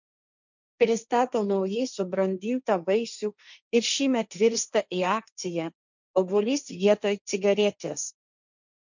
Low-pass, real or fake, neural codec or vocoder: 7.2 kHz; fake; codec, 16 kHz, 1.1 kbps, Voila-Tokenizer